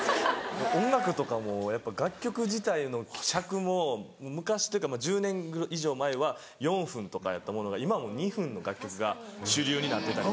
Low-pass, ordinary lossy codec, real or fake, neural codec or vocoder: none; none; real; none